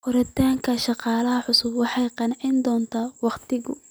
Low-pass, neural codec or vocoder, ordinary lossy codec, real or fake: none; none; none; real